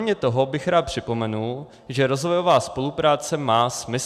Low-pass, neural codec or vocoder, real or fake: 14.4 kHz; none; real